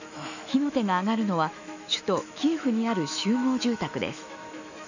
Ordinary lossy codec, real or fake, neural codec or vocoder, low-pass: none; fake; autoencoder, 48 kHz, 128 numbers a frame, DAC-VAE, trained on Japanese speech; 7.2 kHz